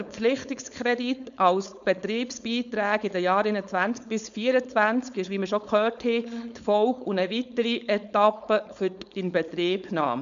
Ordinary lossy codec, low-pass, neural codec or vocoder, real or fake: none; 7.2 kHz; codec, 16 kHz, 4.8 kbps, FACodec; fake